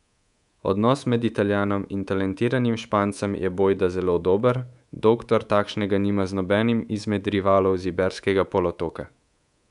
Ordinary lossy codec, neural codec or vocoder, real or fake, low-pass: none; codec, 24 kHz, 3.1 kbps, DualCodec; fake; 10.8 kHz